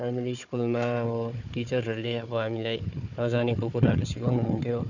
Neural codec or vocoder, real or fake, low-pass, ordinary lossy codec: codec, 16 kHz, 4 kbps, FunCodec, trained on Chinese and English, 50 frames a second; fake; 7.2 kHz; none